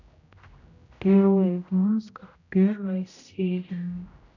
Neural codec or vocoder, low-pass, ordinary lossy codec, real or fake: codec, 16 kHz, 0.5 kbps, X-Codec, HuBERT features, trained on general audio; 7.2 kHz; none; fake